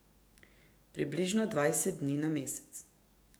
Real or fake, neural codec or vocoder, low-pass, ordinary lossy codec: fake; codec, 44.1 kHz, 7.8 kbps, DAC; none; none